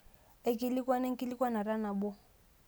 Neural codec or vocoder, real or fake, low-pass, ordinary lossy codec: none; real; none; none